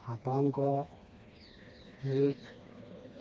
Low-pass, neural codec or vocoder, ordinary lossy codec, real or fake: none; codec, 16 kHz, 2 kbps, FreqCodec, smaller model; none; fake